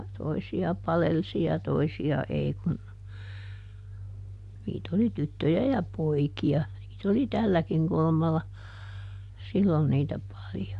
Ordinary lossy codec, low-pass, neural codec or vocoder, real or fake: AAC, 64 kbps; 10.8 kHz; none; real